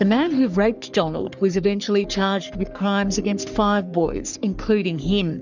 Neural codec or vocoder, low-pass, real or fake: codec, 44.1 kHz, 3.4 kbps, Pupu-Codec; 7.2 kHz; fake